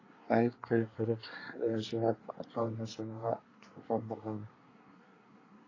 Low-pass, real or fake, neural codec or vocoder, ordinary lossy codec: 7.2 kHz; fake; codec, 24 kHz, 1 kbps, SNAC; AAC, 32 kbps